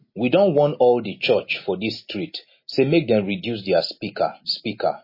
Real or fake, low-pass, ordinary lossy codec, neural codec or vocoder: real; 5.4 kHz; MP3, 24 kbps; none